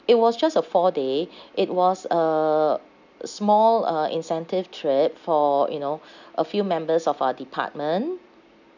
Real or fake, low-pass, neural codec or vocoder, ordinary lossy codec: real; 7.2 kHz; none; none